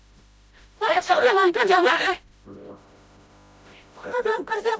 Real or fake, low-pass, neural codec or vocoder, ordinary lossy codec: fake; none; codec, 16 kHz, 0.5 kbps, FreqCodec, smaller model; none